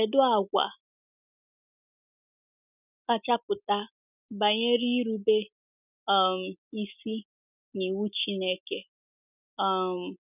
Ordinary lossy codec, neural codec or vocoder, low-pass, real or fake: none; none; 3.6 kHz; real